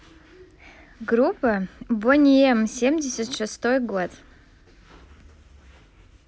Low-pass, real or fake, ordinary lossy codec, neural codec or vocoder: none; real; none; none